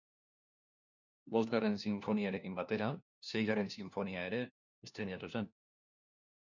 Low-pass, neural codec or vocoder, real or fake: 7.2 kHz; codec, 16 kHz, 1 kbps, FunCodec, trained on LibriTTS, 50 frames a second; fake